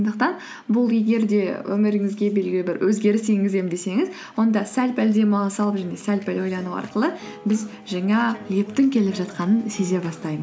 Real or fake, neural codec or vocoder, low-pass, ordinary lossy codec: real; none; none; none